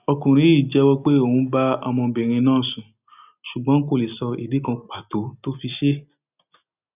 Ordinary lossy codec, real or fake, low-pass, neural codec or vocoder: none; real; 3.6 kHz; none